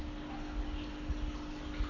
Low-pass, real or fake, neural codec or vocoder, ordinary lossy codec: 7.2 kHz; real; none; none